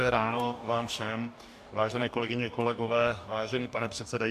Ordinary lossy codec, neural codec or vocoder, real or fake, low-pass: MP3, 96 kbps; codec, 44.1 kHz, 2.6 kbps, DAC; fake; 14.4 kHz